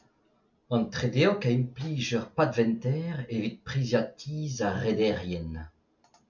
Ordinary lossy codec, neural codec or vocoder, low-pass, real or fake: MP3, 64 kbps; none; 7.2 kHz; real